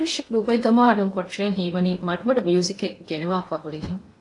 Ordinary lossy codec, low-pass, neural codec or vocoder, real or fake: AAC, 48 kbps; 10.8 kHz; codec, 16 kHz in and 24 kHz out, 0.8 kbps, FocalCodec, streaming, 65536 codes; fake